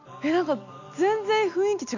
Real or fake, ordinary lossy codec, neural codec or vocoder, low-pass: real; none; none; 7.2 kHz